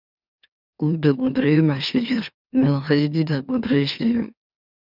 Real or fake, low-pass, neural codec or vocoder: fake; 5.4 kHz; autoencoder, 44.1 kHz, a latent of 192 numbers a frame, MeloTTS